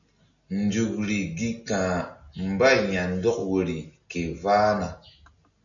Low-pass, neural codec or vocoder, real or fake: 7.2 kHz; none; real